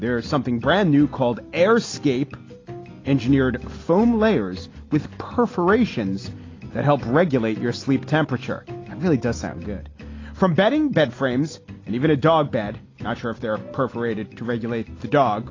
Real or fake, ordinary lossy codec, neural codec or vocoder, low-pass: real; AAC, 32 kbps; none; 7.2 kHz